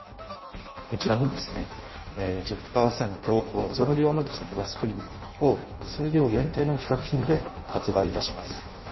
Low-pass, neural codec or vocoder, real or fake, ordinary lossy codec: 7.2 kHz; codec, 16 kHz in and 24 kHz out, 0.6 kbps, FireRedTTS-2 codec; fake; MP3, 24 kbps